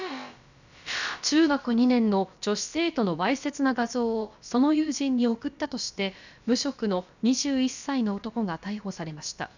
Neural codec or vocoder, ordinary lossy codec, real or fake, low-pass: codec, 16 kHz, about 1 kbps, DyCAST, with the encoder's durations; none; fake; 7.2 kHz